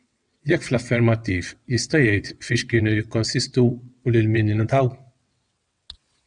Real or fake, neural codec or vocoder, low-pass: fake; vocoder, 22.05 kHz, 80 mel bands, WaveNeXt; 9.9 kHz